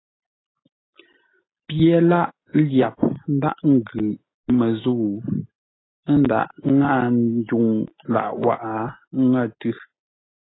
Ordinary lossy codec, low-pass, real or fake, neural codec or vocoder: AAC, 16 kbps; 7.2 kHz; real; none